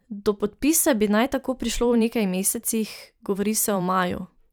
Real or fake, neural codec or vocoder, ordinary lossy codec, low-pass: fake; vocoder, 44.1 kHz, 128 mel bands every 512 samples, BigVGAN v2; none; none